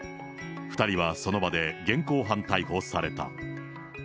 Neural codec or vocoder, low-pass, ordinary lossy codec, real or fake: none; none; none; real